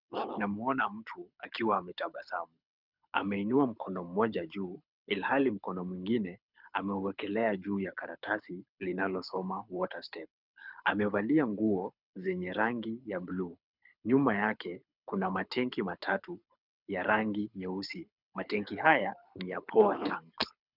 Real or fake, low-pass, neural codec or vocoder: fake; 5.4 kHz; codec, 24 kHz, 6 kbps, HILCodec